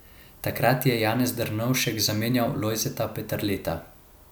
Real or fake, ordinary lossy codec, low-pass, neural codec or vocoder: real; none; none; none